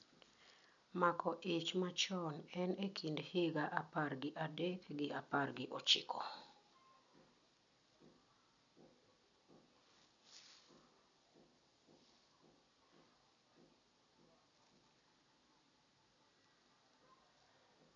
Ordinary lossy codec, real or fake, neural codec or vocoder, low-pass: MP3, 96 kbps; real; none; 7.2 kHz